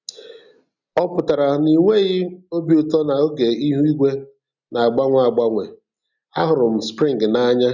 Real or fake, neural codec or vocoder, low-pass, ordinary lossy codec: real; none; 7.2 kHz; none